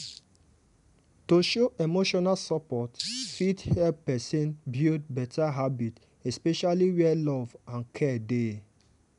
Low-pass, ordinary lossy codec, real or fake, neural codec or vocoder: 10.8 kHz; none; real; none